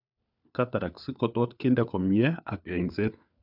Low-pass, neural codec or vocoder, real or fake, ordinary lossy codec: 5.4 kHz; codec, 16 kHz, 4 kbps, FunCodec, trained on LibriTTS, 50 frames a second; fake; none